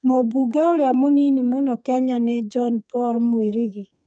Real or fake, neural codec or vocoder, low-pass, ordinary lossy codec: fake; codec, 32 kHz, 1.9 kbps, SNAC; 9.9 kHz; none